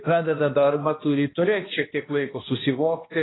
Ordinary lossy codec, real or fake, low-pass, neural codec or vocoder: AAC, 16 kbps; fake; 7.2 kHz; codec, 16 kHz, 1 kbps, X-Codec, HuBERT features, trained on balanced general audio